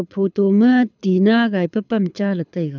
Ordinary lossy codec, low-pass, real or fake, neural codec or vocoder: none; 7.2 kHz; fake; codec, 24 kHz, 6 kbps, HILCodec